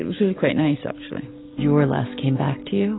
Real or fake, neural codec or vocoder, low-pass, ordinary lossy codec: real; none; 7.2 kHz; AAC, 16 kbps